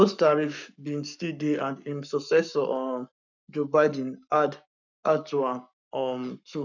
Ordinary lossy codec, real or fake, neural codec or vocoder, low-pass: none; fake; codec, 44.1 kHz, 7.8 kbps, Pupu-Codec; 7.2 kHz